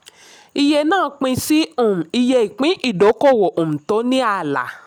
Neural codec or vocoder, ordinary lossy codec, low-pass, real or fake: none; none; 19.8 kHz; real